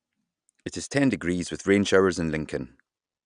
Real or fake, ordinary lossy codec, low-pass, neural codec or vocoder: real; Opus, 64 kbps; 9.9 kHz; none